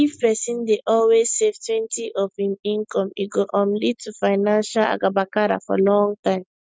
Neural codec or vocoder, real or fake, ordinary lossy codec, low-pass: none; real; none; none